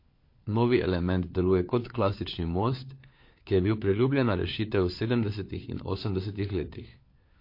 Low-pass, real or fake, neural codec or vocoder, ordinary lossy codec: 5.4 kHz; fake; codec, 16 kHz, 4 kbps, FunCodec, trained on LibriTTS, 50 frames a second; MP3, 32 kbps